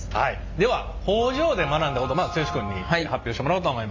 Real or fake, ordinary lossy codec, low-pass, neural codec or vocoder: real; MP3, 64 kbps; 7.2 kHz; none